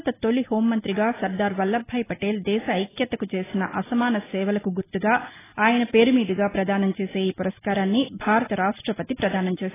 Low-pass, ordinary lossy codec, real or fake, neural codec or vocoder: 3.6 kHz; AAC, 16 kbps; real; none